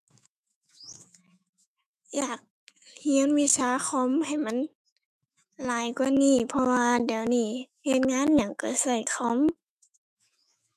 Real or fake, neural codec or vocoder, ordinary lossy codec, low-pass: fake; autoencoder, 48 kHz, 128 numbers a frame, DAC-VAE, trained on Japanese speech; MP3, 96 kbps; 14.4 kHz